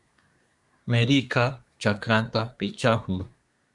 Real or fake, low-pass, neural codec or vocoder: fake; 10.8 kHz; codec, 24 kHz, 1 kbps, SNAC